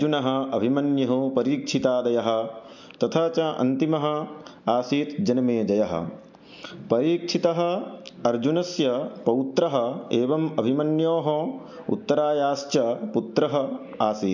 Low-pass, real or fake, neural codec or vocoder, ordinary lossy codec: 7.2 kHz; real; none; MP3, 64 kbps